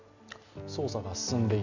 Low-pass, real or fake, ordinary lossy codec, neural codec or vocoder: 7.2 kHz; real; none; none